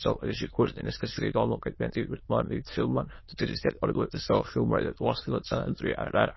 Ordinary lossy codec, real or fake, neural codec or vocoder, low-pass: MP3, 24 kbps; fake; autoencoder, 22.05 kHz, a latent of 192 numbers a frame, VITS, trained on many speakers; 7.2 kHz